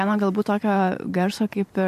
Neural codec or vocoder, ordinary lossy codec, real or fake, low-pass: vocoder, 44.1 kHz, 128 mel bands every 512 samples, BigVGAN v2; MP3, 64 kbps; fake; 14.4 kHz